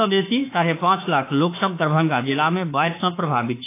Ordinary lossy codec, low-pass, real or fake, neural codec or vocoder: AAC, 24 kbps; 3.6 kHz; fake; autoencoder, 48 kHz, 32 numbers a frame, DAC-VAE, trained on Japanese speech